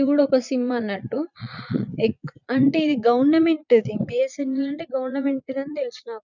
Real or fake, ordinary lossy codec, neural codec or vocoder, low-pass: fake; none; vocoder, 22.05 kHz, 80 mel bands, Vocos; 7.2 kHz